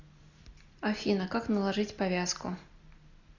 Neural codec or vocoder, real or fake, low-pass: none; real; 7.2 kHz